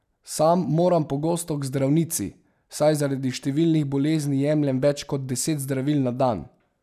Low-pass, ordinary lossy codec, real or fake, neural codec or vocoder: 14.4 kHz; none; real; none